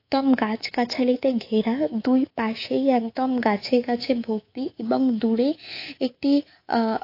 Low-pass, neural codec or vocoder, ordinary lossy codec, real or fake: 5.4 kHz; codec, 24 kHz, 3.1 kbps, DualCodec; AAC, 32 kbps; fake